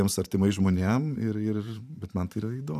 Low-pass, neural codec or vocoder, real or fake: 14.4 kHz; vocoder, 44.1 kHz, 128 mel bands every 512 samples, BigVGAN v2; fake